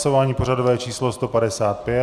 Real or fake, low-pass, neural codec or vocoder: real; 14.4 kHz; none